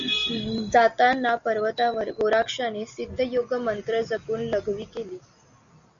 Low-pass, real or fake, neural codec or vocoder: 7.2 kHz; real; none